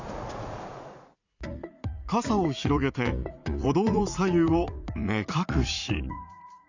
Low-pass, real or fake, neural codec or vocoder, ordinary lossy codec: 7.2 kHz; fake; vocoder, 44.1 kHz, 80 mel bands, Vocos; Opus, 64 kbps